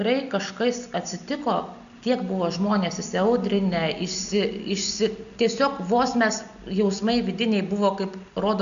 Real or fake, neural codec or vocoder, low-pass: real; none; 7.2 kHz